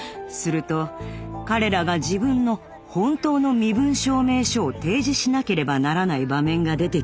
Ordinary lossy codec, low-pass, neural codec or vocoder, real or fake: none; none; none; real